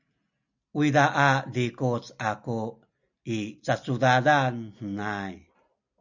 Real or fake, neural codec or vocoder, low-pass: real; none; 7.2 kHz